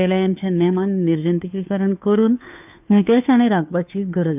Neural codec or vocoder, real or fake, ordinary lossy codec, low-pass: codec, 16 kHz, 2 kbps, FunCodec, trained on Chinese and English, 25 frames a second; fake; none; 3.6 kHz